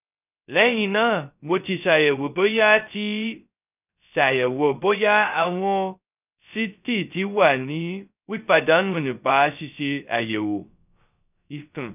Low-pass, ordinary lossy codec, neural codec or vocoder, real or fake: 3.6 kHz; none; codec, 16 kHz, 0.2 kbps, FocalCodec; fake